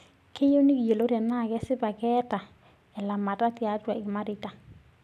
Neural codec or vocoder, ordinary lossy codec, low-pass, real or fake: none; none; 14.4 kHz; real